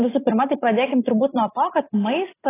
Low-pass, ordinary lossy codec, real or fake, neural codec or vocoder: 3.6 kHz; AAC, 16 kbps; real; none